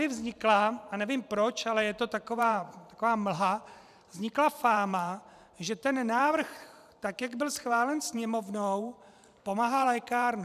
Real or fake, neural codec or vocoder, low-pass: fake; vocoder, 44.1 kHz, 128 mel bands every 512 samples, BigVGAN v2; 14.4 kHz